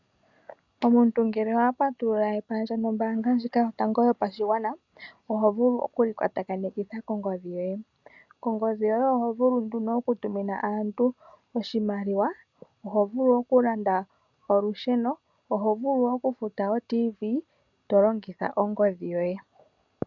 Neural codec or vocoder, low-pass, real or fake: none; 7.2 kHz; real